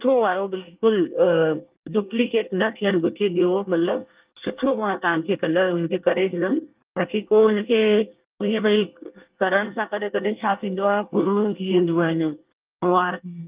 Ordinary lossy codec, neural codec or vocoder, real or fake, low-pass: Opus, 24 kbps; codec, 24 kHz, 1 kbps, SNAC; fake; 3.6 kHz